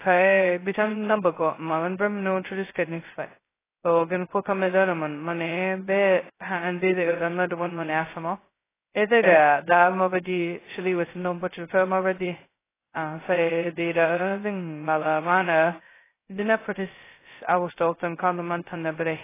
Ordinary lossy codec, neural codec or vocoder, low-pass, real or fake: AAC, 16 kbps; codec, 16 kHz, 0.2 kbps, FocalCodec; 3.6 kHz; fake